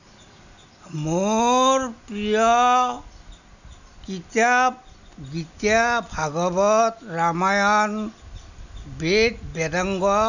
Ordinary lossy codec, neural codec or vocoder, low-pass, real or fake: none; none; 7.2 kHz; real